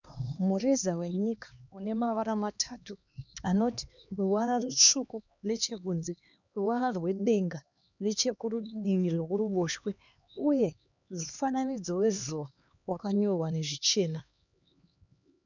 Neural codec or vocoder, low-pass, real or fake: codec, 16 kHz, 2 kbps, X-Codec, HuBERT features, trained on LibriSpeech; 7.2 kHz; fake